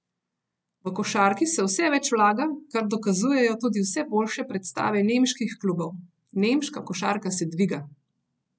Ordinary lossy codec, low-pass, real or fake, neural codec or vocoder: none; none; real; none